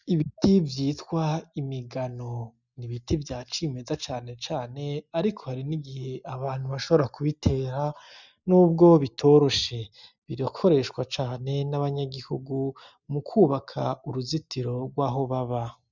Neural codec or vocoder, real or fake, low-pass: none; real; 7.2 kHz